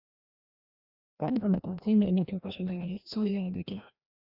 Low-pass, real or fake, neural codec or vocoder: 5.4 kHz; fake; codec, 16 kHz, 1 kbps, FreqCodec, larger model